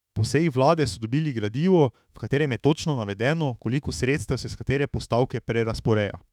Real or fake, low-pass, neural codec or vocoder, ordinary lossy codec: fake; 19.8 kHz; autoencoder, 48 kHz, 32 numbers a frame, DAC-VAE, trained on Japanese speech; none